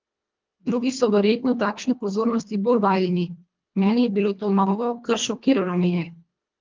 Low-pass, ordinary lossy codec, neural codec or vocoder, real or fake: 7.2 kHz; Opus, 32 kbps; codec, 24 kHz, 1.5 kbps, HILCodec; fake